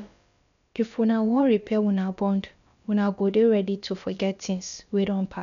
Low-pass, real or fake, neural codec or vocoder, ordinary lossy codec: 7.2 kHz; fake; codec, 16 kHz, about 1 kbps, DyCAST, with the encoder's durations; none